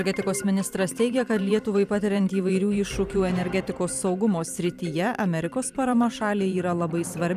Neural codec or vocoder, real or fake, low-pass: vocoder, 44.1 kHz, 128 mel bands every 512 samples, BigVGAN v2; fake; 14.4 kHz